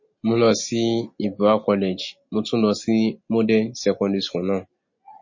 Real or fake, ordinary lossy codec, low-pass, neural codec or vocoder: real; MP3, 32 kbps; 7.2 kHz; none